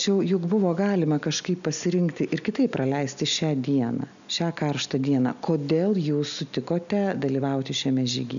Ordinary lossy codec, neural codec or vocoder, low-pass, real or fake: MP3, 96 kbps; none; 7.2 kHz; real